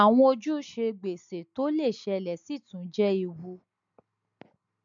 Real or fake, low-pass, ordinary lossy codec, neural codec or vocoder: real; 7.2 kHz; MP3, 64 kbps; none